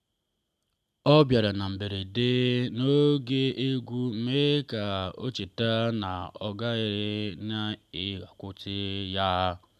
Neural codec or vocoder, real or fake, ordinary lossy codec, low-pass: none; real; none; 14.4 kHz